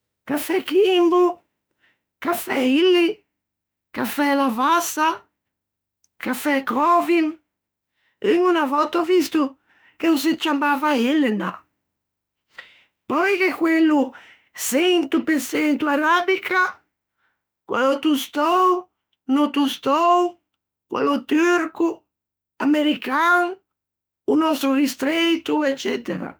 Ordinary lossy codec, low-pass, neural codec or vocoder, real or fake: none; none; autoencoder, 48 kHz, 32 numbers a frame, DAC-VAE, trained on Japanese speech; fake